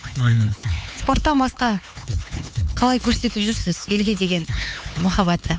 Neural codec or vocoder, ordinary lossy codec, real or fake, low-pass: codec, 16 kHz, 4 kbps, X-Codec, HuBERT features, trained on LibriSpeech; none; fake; none